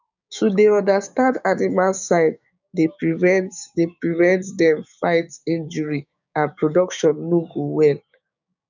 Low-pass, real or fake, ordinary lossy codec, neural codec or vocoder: 7.2 kHz; fake; none; codec, 16 kHz, 6 kbps, DAC